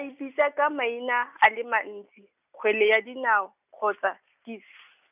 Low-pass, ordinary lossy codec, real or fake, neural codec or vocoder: 3.6 kHz; none; real; none